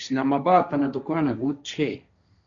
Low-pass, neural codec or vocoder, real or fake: 7.2 kHz; codec, 16 kHz, 1.1 kbps, Voila-Tokenizer; fake